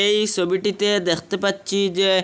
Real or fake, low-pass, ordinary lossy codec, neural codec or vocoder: real; none; none; none